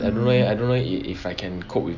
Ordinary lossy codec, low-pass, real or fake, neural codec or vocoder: none; 7.2 kHz; real; none